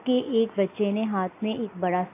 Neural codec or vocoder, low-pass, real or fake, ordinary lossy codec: none; 3.6 kHz; real; AAC, 24 kbps